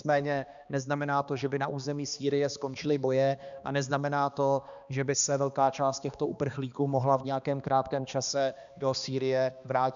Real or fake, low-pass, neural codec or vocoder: fake; 7.2 kHz; codec, 16 kHz, 2 kbps, X-Codec, HuBERT features, trained on balanced general audio